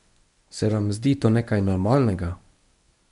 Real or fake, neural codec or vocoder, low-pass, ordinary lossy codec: fake; codec, 24 kHz, 0.9 kbps, WavTokenizer, medium speech release version 1; 10.8 kHz; none